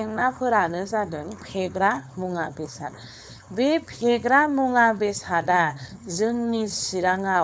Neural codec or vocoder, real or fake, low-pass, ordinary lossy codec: codec, 16 kHz, 4.8 kbps, FACodec; fake; none; none